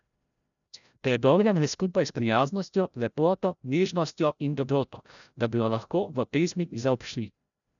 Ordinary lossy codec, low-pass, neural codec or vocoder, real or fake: none; 7.2 kHz; codec, 16 kHz, 0.5 kbps, FreqCodec, larger model; fake